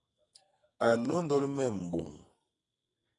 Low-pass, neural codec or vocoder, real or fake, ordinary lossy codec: 10.8 kHz; codec, 44.1 kHz, 2.6 kbps, SNAC; fake; AAC, 32 kbps